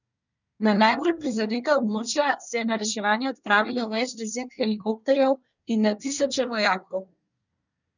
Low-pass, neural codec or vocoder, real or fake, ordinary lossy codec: 7.2 kHz; codec, 24 kHz, 1 kbps, SNAC; fake; none